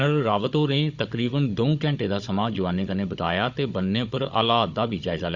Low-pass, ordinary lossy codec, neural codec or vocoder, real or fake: 7.2 kHz; Opus, 64 kbps; codec, 16 kHz, 16 kbps, FunCodec, trained on Chinese and English, 50 frames a second; fake